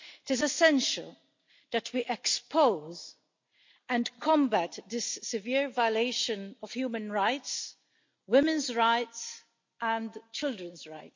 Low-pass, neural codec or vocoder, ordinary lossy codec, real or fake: 7.2 kHz; none; MP3, 48 kbps; real